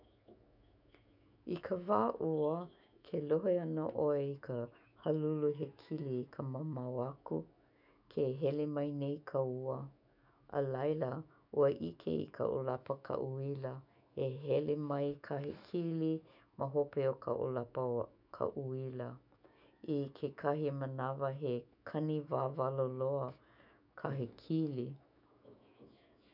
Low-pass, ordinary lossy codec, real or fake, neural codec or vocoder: 5.4 kHz; none; fake; autoencoder, 48 kHz, 128 numbers a frame, DAC-VAE, trained on Japanese speech